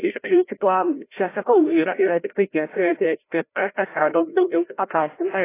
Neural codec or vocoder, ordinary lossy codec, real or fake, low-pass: codec, 16 kHz, 0.5 kbps, FreqCodec, larger model; AAC, 24 kbps; fake; 3.6 kHz